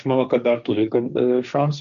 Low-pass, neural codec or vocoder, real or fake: 7.2 kHz; codec, 16 kHz, 1.1 kbps, Voila-Tokenizer; fake